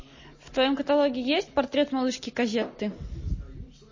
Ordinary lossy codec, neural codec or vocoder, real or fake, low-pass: MP3, 32 kbps; none; real; 7.2 kHz